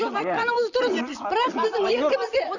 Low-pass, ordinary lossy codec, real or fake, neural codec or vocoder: 7.2 kHz; none; fake; vocoder, 44.1 kHz, 128 mel bands, Pupu-Vocoder